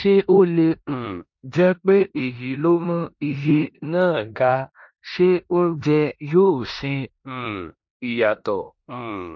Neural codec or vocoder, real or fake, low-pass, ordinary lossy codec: codec, 16 kHz in and 24 kHz out, 0.9 kbps, LongCat-Audio-Codec, four codebook decoder; fake; 7.2 kHz; MP3, 48 kbps